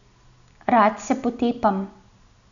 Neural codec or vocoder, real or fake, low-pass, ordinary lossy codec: none; real; 7.2 kHz; none